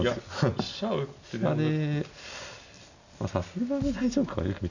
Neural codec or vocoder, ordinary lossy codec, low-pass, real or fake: none; none; 7.2 kHz; real